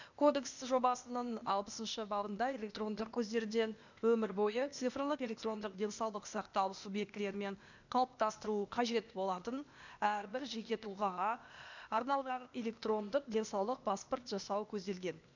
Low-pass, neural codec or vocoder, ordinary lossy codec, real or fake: 7.2 kHz; codec, 16 kHz, 0.8 kbps, ZipCodec; none; fake